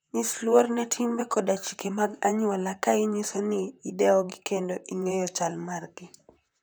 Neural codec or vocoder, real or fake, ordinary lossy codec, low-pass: vocoder, 44.1 kHz, 128 mel bands, Pupu-Vocoder; fake; none; none